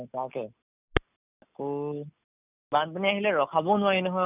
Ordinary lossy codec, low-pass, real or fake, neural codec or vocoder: none; 3.6 kHz; real; none